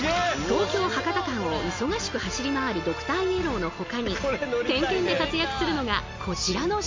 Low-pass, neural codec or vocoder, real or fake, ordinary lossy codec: 7.2 kHz; none; real; MP3, 48 kbps